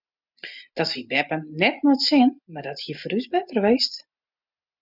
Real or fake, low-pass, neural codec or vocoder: real; 5.4 kHz; none